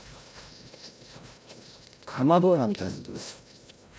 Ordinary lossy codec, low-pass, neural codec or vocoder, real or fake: none; none; codec, 16 kHz, 0.5 kbps, FreqCodec, larger model; fake